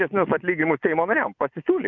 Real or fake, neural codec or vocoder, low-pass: fake; vocoder, 24 kHz, 100 mel bands, Vocos; 7.2 kHz